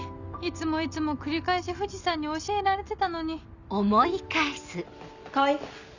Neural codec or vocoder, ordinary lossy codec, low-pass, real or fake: none; none; 7.2 kHz; real